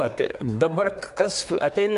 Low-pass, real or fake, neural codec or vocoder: 10.8 kHz; fake; codec, 24 kHz, 1 kbps, SNAC